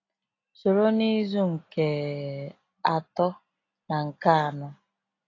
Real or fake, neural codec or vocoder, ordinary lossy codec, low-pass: real; none; AAC, 32 kbps; 7.2 kHz